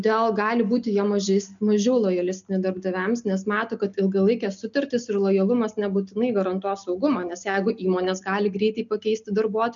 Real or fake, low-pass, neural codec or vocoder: real; 7.2 kHz; none